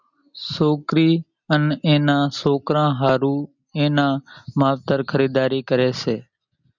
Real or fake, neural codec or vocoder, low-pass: real; none; 7.2 kHz